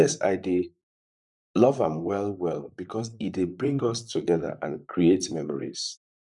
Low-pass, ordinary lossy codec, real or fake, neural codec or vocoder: 10.8 kHz; none; fake; vocoder, 44.1 kHz, 128 mel bands, Pupu-Vocoder